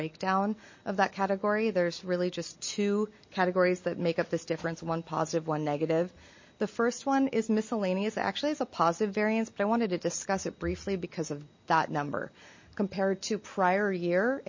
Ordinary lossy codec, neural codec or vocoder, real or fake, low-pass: MP3, 32 kbps; none; real; 7.2 kHz